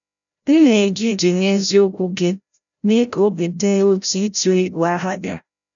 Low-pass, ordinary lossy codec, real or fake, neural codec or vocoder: 7.2 kHz; none; fake; codec, 16 kHz, 0.5 kbps, FreqCodec, larger model